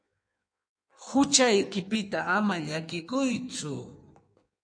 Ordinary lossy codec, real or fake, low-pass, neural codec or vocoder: AAC, 64 kbps; fake; 9.9 kHz; codec, 16 kHz in and 24 kHz out, 1.1 kbps, FireRedTTS-2 codec